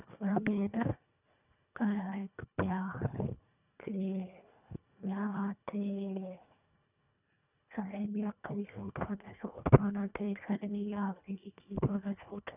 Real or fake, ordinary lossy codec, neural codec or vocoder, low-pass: fake; none; codec, 24 kHz, 1.5 kbps, HILCodec; 3.6 kHz